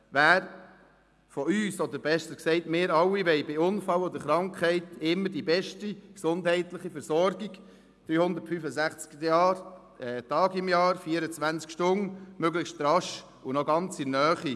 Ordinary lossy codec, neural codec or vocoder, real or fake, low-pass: none; none; real; none